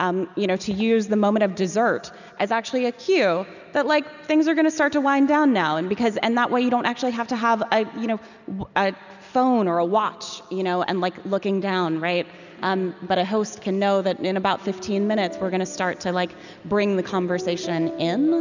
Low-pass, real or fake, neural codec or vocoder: 7.2 kHz; real; none